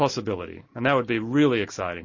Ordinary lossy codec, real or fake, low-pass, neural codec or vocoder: MP3, 32 kbps; real; 7.2 kHz; none